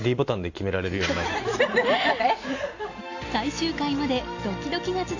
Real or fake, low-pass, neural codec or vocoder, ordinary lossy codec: real; 7.2 kHz; none; AAC, 48 kbps